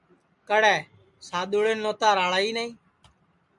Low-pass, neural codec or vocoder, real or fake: 10.8 kHz; none; real